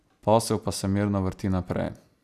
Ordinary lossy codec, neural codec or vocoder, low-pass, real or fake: none; none; 14.4 kHz; real